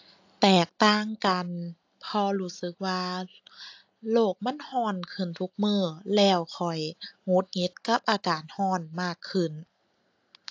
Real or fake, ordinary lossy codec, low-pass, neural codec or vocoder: real; AAC, 48 kbps; 7.2 kHz; none